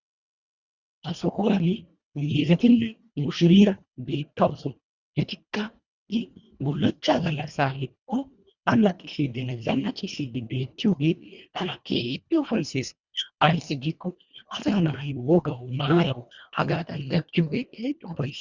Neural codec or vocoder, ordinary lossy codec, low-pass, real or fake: codec, 24 kHz, 1.5 kbps, HILCodec; Opus, 64 kbps; 7.2 kHz; fake